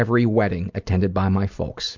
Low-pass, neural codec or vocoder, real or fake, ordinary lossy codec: 7.2 kHz; none; real; MP3, 64 kbps